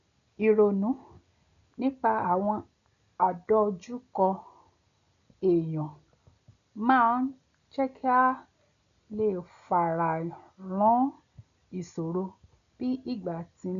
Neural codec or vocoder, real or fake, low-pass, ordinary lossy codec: none; real; 7.2 kHz; MP3, 96 kbps